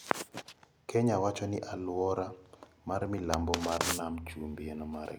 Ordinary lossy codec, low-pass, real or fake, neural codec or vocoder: none; none; real; none